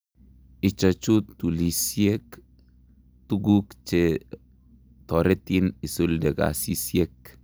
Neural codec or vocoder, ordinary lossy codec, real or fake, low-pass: none; none; real; none